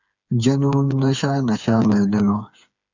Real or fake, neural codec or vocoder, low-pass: fake; codec, 16 kHz, 4 kbps, FreqCodec, smaller model; 7.2 kHz